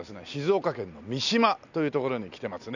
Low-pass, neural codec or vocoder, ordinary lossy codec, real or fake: 7.2 kHz; none; none; real